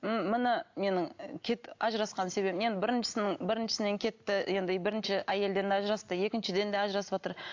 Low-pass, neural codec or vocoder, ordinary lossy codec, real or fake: 7.2 kHz; none; AAC, 48 kbps; real